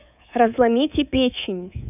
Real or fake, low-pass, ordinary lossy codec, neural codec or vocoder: fake; 3.6 kHz; none; codec, 24 kHz, 3.1 kbps, DualCodec